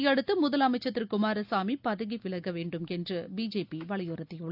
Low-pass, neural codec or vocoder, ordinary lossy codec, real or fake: 5.4 kHz; none; none; real